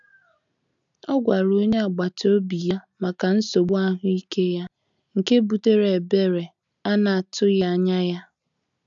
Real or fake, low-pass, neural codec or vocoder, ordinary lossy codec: real; 7.2 kHz; none; none